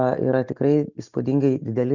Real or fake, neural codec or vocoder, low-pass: real; none; 7.2 kHz